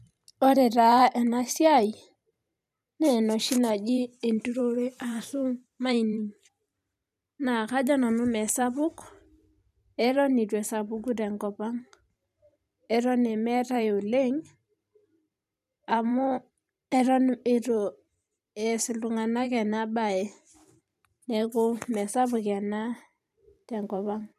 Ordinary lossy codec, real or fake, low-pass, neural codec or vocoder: none; fake; 14.4 kHz; vocoder, 44.1 kHz, 128 mel bands every 512 samples, BigVGAN v2